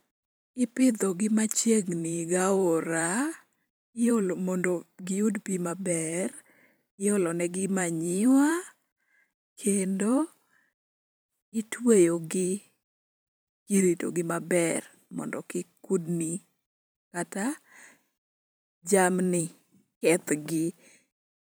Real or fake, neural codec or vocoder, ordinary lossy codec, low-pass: fake; vocoder, 44.1 kHz, 128 mel bands every 512 samples, BigVGAN v2; none; none